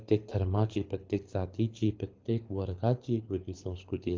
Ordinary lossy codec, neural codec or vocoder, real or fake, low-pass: Opus, 24 kbps; codec, 24 kHz, 6 kbps, HILCodec; fake; 7.2 kHz